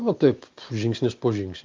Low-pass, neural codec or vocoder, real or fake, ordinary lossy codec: 7.2 kHz; none; real; Opus, 24 kbps